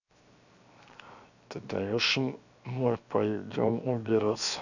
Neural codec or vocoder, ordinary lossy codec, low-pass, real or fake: codec, 16 kHz, 0.7 kbps, FocalCodec; none; 7.2 kHz; fake